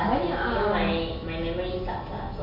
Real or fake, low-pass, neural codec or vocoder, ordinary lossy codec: real; 5.4 kHz; none; none